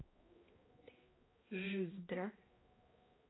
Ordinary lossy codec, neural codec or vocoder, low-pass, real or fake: AAC, 16 kbps; codec, 16 kHz, 1 kbps, X-Codec, HuBERT features, trained on balanced general audio; 7.2 kHz; fake